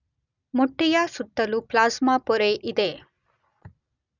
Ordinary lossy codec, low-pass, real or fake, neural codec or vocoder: none; 7.2 kHz; real; none